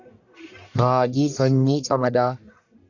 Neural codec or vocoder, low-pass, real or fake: codec, 44.1 kHz, 1.7 kbps, Pupu-Codec; 7.2 kHz; fake